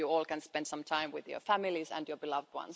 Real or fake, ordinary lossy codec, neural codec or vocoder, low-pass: real; none; none; none